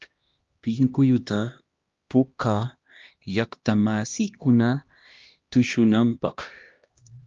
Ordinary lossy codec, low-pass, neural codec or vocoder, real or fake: Opus, 32 kbps; 7.2 kHz; codec, 16 kHz, 1 kbps, X-Codec, HuBERT features, trained on LibriSpeech; fake